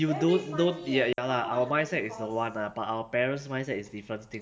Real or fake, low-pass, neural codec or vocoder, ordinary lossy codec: real; none; none; none